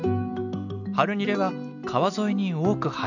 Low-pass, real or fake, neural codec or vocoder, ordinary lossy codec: 7.2 kHz; real; none; none